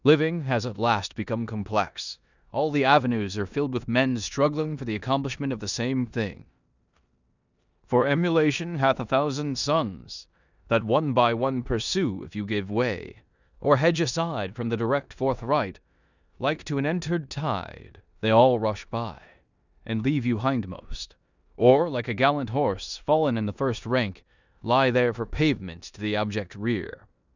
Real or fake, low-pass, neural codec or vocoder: fake; 7.2 kHz; codec, 16 kHz in and 24 kHz out, 0.9 kbps, LongCat-Audio-Codec, four codebook decoder